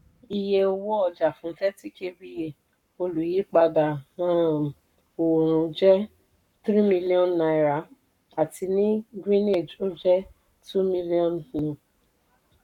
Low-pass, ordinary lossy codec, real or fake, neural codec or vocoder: 19.8 kHz; none; fake; codec, 44.1 kHz, 7.8 kbps, Pupu-Codec